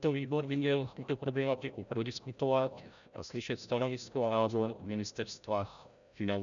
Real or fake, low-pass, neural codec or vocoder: fake; 7.2 kHz; codec, 16 kHz, 0.5 kbps, FreqCodec, larger model